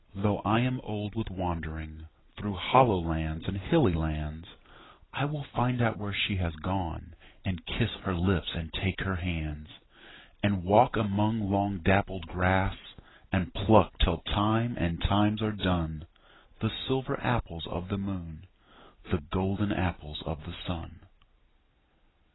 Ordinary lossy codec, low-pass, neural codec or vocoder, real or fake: AAC, 16 kbps; 7.2 kHz; none; real